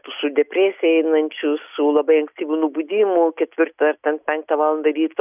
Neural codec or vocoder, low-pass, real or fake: none; 3.6 kHz; real